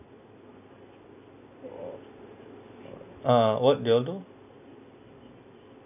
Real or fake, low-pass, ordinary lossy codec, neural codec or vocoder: real; 3.6 kHz; none; none